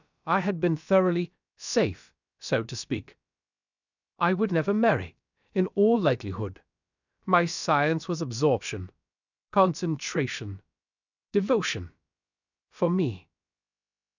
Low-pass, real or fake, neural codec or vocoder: 7.2 kHz; fake; codec, 16 kHz, about 1 kbps, DyCAST, with the encoder's durations